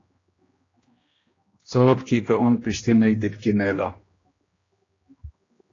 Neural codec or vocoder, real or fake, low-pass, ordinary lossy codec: codec, 16 kHz, 1 kbps, X-Codec, HuBERT features, trained on general audio; fake; 7.2 kHz; AAC, 32 kbps